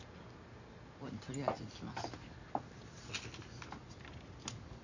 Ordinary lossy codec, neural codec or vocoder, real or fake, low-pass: none; none; real; 7.2 kHz